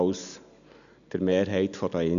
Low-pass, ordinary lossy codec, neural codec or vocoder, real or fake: 7.2 kHz; none; none; real